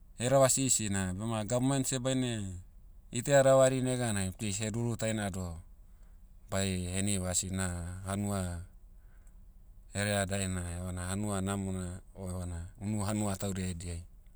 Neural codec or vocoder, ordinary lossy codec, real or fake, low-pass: none; none; real; none